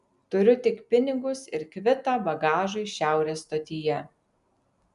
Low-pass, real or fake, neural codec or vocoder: 10.8 kHz; real; none